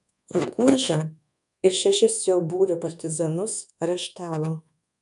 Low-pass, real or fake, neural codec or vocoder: 10.8 kHz; fake; codec, 24 kHz, 1.2 kbps, DualCodec